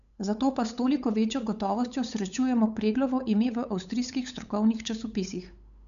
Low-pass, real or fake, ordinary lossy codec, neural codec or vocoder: 7.2 kHz; fake; none; codec, 16 kHz, 8 kbps, FunCodec, trained on LibriTTS, 25 frames a second